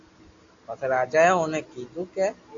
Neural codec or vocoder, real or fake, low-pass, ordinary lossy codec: none; real; 7.2 kHz; AAC, 48 kbps